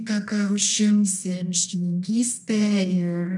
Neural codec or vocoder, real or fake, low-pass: codec, 24 kHz, 0.9 kbps, WavTokenizer, medium music audio release; fake; 10.8 kHz